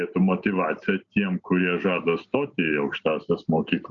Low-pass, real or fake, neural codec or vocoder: 7.2 kHz; real; none